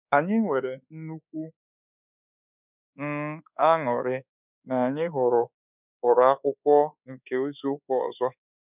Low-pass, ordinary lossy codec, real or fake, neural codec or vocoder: 3.6 kHz; none; fake; codec, 24 kHz, 1.2 kbps, DualCodec